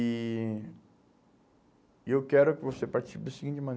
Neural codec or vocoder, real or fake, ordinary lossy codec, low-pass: none; real; none; none